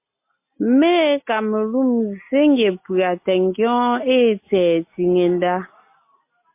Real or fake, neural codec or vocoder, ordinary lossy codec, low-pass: real; none; MP3, 24 kbps; 3.6 kHz